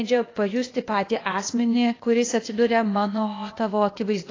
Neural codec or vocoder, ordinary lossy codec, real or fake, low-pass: codec, 16 kHz, 0.8 kbps, ZipCodec; AAC, 32 kbps; fake; 7.2 kHz